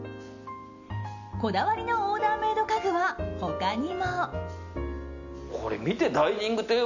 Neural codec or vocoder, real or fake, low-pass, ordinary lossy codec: none; real; 7.2 kHz; none